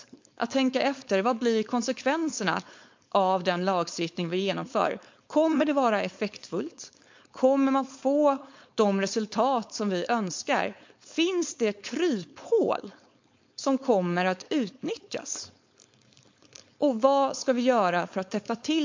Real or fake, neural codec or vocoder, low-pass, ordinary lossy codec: fake; codec, 16 kHz, 4.8 kbps, FACodec; 7.2 kHz; MP3, 48 kbps